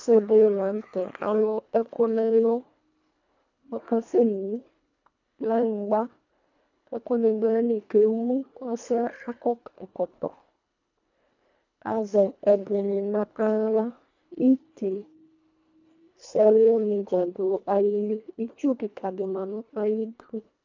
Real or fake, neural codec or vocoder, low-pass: fake; codec, 24 kHz, 1.5 kbps, HILCodec; 7.2 kHz